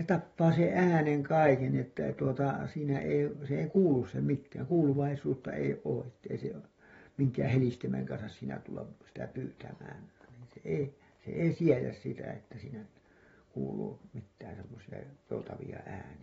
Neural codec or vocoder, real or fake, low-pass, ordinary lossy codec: none; real; 19.8 kHz; AAC, 24 kbps